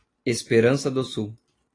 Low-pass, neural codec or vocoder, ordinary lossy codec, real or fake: 9.9 kHz; none; AAC, 32 kbps; real